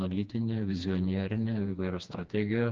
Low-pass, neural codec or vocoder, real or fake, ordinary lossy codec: 7.2 kHz; codec, 16 kHz, 2 kbps, FreqCodec, smaller model; fake; Opus, 24 kbps